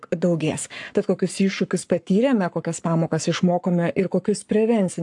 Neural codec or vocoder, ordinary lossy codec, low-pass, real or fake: codec, 44.1 kHz, 7.8 kbps, Pupu-Codec; AAC, 64 kbps; 10.8 kHz; fake